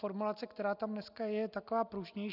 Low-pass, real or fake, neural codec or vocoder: 5.4 kHz; real; none